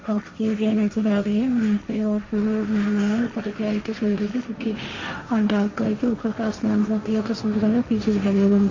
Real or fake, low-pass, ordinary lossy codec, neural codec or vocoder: fake; 7.2 kHz; MP3, 64 kbps; codec, 16 kHz, 1.1 kbps, Voila-Tokenizer